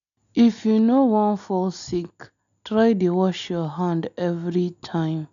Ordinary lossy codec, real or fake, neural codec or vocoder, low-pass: none; real; none; 7.2 kHz